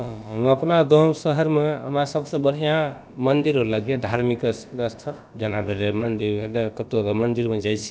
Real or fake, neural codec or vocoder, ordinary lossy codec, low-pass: fake; codec, 16 kHz, about 1 kbps, DyCAST, with the encoder's durations; none; none